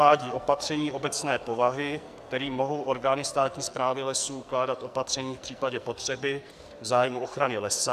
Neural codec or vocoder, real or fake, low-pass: codec, 44.1 kHz, 2.6 kbps, SNAC; fake; 14.4 kHz